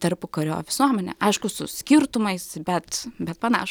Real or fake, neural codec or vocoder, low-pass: real; none; 19.8 kHz